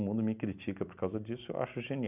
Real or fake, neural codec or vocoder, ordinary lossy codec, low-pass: real; none; none; 3.6 kHz